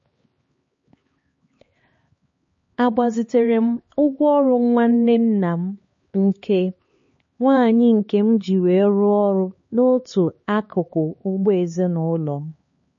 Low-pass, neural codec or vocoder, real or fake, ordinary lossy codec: 7.2 kHz; codec, 16 kHz, 4 kbps, X-Codec, HuBERT features, trained on LibriSpeech; fake; MP3, 32 kbps